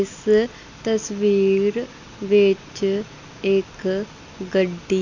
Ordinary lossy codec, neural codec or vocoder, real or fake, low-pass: none; none; real; 7.2 kHz